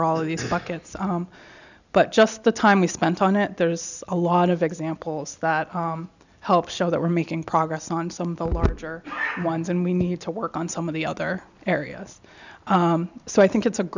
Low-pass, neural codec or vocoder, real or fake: 7.2 kHz; none; real